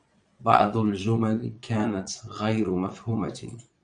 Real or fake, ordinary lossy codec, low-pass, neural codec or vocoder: fake; Opus, 64 kbps; 9.9 kHz; vocoder, 22.05 kHz, 80 mel bands, Vocos